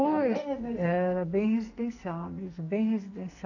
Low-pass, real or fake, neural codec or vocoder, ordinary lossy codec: 7.2 kHz; fake; codec, 44.1 kHz, 2.6 kbps, SNAC; none